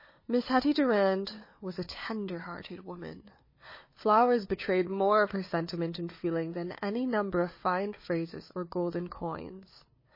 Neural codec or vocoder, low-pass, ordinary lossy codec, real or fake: codec, 16 kHz, 4 kbps, FunCodec, trained on Chinese and English, 50 frames a second; 5.4 kHz; MP3, 24 kbps; fake